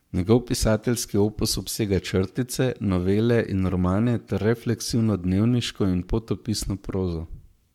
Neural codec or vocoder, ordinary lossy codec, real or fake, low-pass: codec, 44.1 kHz, 7.8 kbps, Pupu-Codec; MP3, 96 kbps; fake; 19.8 kHz